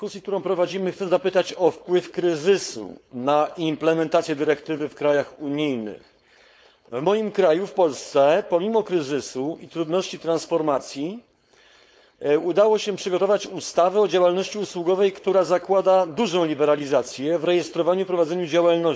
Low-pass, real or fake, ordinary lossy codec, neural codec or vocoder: none; fake; none; codec, 16 kHz, 4.8 kbps, FACodec